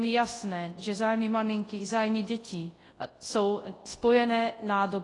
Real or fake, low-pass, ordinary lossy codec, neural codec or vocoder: fake; 10.8 kHz; AAC, 32 kbps; codec, 24 kHz, 0.9 kbps, WavTokenizer, large speech release